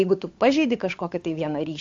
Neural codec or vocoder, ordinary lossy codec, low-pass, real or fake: codec, 16 kHz, 8 kbps, FunCodec, trained on Chinese and English, 25 frames a second; AAC, 48 kbps; 7.2 kHz; fake